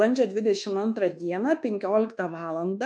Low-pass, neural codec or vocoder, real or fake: 9.9 kHz; codec, 24 kHz, 1.2 kbps, DualCodec; fake